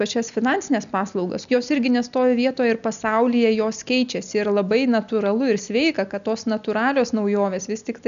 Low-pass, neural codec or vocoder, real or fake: 7.2 kHz; none; real